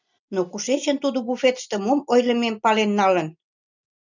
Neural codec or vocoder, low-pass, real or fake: none; 7.2 kHz; real